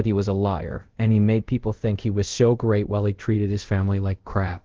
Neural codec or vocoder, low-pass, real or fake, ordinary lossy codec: codec, 24 kHz, 0.5 kbps, DualCodec; 7.2 kHz; fake; Opus, 16 kbps